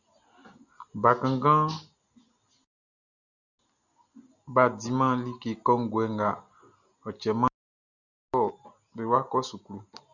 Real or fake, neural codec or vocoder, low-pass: real; none; 7.2 kHz